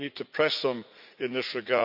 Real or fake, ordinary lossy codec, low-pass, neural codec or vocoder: fake; none; 5.4 kHz; vocoder, 44.1 kHz, 80 mel bands, Vocos